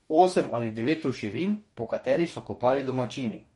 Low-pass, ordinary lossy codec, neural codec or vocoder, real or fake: 19.8 kHz; MP3, 48 kbps; codec, 44.1 kHz, 2.6 kbps, DAC; fake